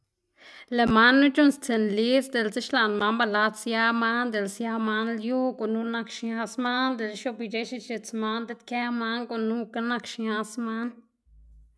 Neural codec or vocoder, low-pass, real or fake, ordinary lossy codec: none; none; real; none